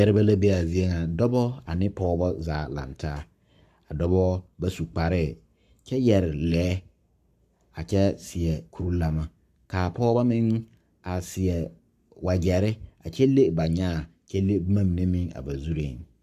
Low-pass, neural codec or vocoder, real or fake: 14.4 kHz; codec, 44.1 kHz, 7.8 kbps, Pupu-Codec; fake